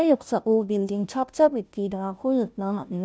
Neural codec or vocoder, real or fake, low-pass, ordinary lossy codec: codec, 16 kHz, 0.5 kbps, FunCodec, trained on Chinese and English, 25 frames a second; fake; none; none